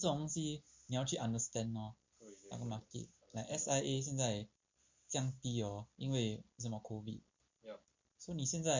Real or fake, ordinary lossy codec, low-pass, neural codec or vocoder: real; MP3, 48 kbps; 7.2 kHz; none